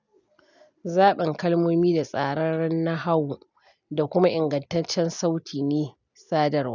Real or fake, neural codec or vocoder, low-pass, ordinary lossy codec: real; none; 7.2 kHz; none